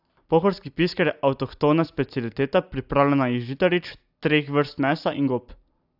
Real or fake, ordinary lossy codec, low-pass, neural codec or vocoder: real; AAC, 48 kbps; 5.4 kHz; none